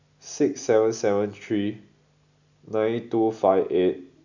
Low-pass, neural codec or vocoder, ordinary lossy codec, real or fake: 7.2 kHz; none; none; real